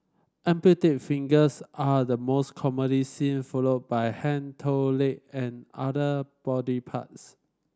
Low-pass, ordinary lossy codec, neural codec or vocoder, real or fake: none; none; none; real